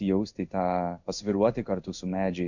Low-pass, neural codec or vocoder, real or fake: 7.2 kHz; codec, 16 kHz in and 24 kHz out, 1 kbps, XY-Tokenizer; fake